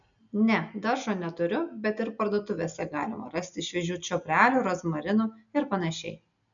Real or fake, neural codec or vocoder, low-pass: real; none; 7.2 kHz